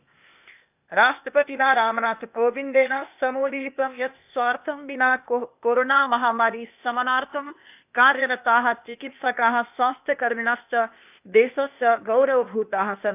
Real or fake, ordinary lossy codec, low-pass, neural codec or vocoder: fake; none; 3.6 kHz; codec, 16 kHz, 0.8 kbps, ZipCodec